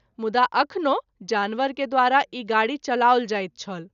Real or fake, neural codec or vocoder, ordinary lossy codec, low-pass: real; none; none; 7.2 kHz